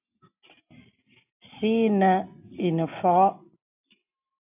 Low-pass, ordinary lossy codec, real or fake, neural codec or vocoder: 3.6 kHz; AAC, 32 kbps; real; none